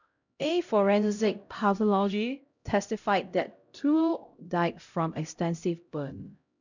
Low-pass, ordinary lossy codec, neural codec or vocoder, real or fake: 7.2 kHz; none; codec, 16 kHz, 0.5 kbps, X-Codec, HuBERT features, trained on LibriSpeech; fake